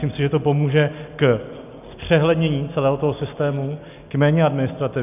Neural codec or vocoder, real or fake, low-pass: none; real; 3.6 kHz